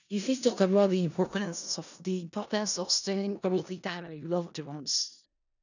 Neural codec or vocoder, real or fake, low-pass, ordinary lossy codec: codec, 16 kHz in and 24 kHz out, 0.4 kbps, LongCat-Audio-Codec, four codebook decoder; fake; 7.2 kHz; none